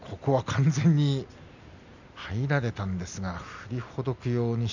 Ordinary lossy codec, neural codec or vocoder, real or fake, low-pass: none; none; real; 7.2 kHz